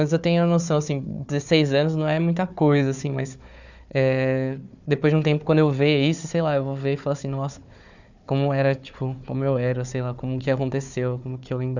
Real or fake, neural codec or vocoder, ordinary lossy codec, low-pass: fake; codec, 16 kHz, 4 kbps, FunCodec, trained on Chinese and English, 50 frames a second; none; 7.2 kHz